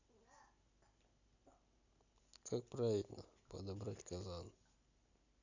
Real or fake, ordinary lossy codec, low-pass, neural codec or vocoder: real; none; 7.2 kHz; none